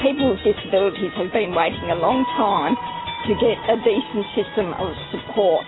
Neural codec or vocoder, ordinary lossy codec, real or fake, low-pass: none; AAC, 16 kbps; real; 7.2 kHz